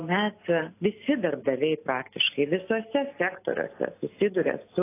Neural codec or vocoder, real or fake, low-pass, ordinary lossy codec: none; real; 3.6 kHz; AAC, 24 kbps